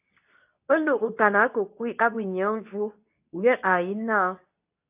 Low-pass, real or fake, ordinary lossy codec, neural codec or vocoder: 3.6 kHz; fake; AAC, 32 kbps; codec, 24 kHz, 0.9 kbps, WavTokenizer, medium speech release version 2